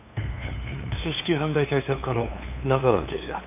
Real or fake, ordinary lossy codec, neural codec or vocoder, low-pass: fake; none; codec, 16 kHz, 2 kbps, FunCodec, trained on LibriTTS, 25 frames a second; 3.6 kHz